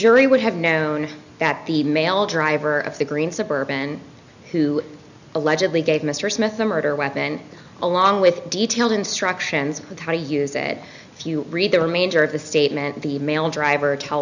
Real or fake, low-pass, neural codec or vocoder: real; 7.2 kHz; none